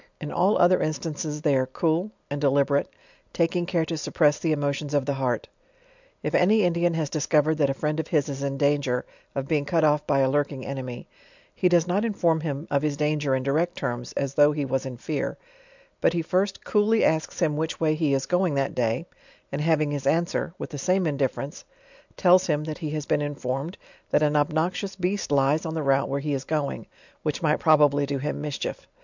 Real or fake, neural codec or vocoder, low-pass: real; none; 7.2 kHz